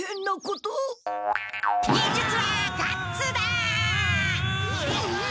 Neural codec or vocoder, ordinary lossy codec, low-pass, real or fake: none; none; none; real